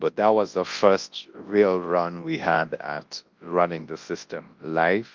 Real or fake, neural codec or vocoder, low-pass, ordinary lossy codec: fake; codec, 24 kHz, 0.9 kbps, WavTokenizer, large speech release; 7.2 kHz; Opus, 24 kbps